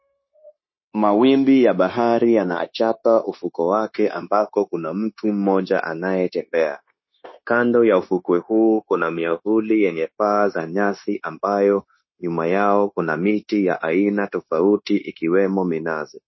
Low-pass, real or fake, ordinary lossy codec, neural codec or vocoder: 7.2 kHz; fake; MP3, 24 kbps; codec, 16 kHz, 0.9 kbps, LongCat-Audio-Codec